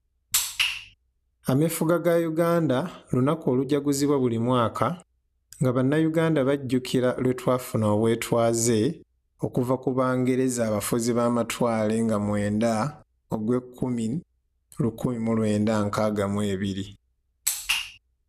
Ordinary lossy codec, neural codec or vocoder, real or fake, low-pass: none; none; real; 14.4 kHz